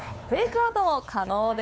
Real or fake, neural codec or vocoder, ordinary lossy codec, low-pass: fake; codec, 16 kHz, 4 kbps, X-Codec, WavLM features, trained on Multilingual LibriSpeech; none; none